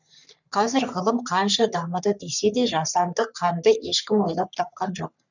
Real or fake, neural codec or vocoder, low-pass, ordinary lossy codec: fake; codec, 44.1 kHz, 3.4 kbps, Pupu-Codec; 7.2 kHz; none